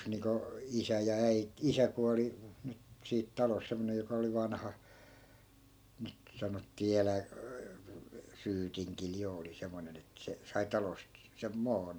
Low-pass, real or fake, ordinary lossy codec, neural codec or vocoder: none; real; none; none